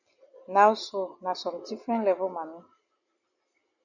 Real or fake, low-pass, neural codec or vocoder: real; 7.2 kHz; none